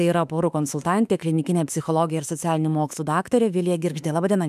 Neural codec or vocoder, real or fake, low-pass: autoencoder, 48 kHz, 32 numbers a frame, DAC-VAE, trained on Japanese speech; fake; 14.4 kHz